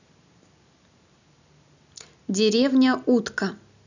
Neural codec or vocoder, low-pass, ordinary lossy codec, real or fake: none; 7.2 kHz; none; real